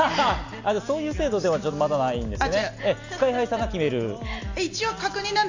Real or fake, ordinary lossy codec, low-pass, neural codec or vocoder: real; AAC, 48 kbps; 7.2 kHz; none